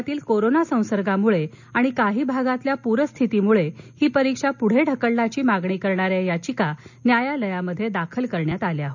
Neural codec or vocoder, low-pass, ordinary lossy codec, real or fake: none; 7.2 kHz; none; real